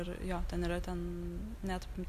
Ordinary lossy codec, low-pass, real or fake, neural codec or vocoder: Opus, 64 kbps; 14.4 kHz; real; none